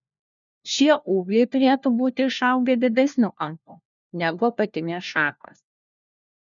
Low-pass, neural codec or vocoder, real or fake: 7.2 kHz; codec, 16 kHz, 1 kbps, FunCodec, trained on LibriTTS, 50 frames a second; fake